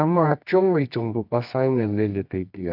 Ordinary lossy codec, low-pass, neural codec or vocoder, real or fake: AAC, 48 kbps; 5.4 kHz; codec, 24 kHz, 0.9 kbps, WavTokenizer, medium music audio release; fake